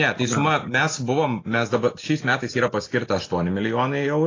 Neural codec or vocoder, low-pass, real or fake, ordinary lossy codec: none; 7.2 kHz; real; AAC, 32 kbps